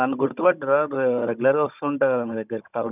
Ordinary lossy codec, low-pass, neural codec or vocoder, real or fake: none; 3.6 kHz; codec, 16 kHz, 16 kbps, FunCodec, trained on Chinese and English, 50 frames a second; fake